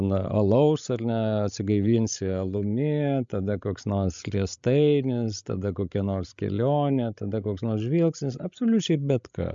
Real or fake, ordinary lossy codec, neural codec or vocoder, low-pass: fake; MP3, 64 kbps; codec, 16 kHz, 16 kbps, FreqCodec, larger model; 7.2 kHz